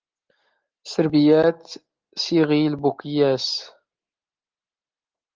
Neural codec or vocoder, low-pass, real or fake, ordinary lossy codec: none; 7.2 kHz; real; Opus, 16 kbps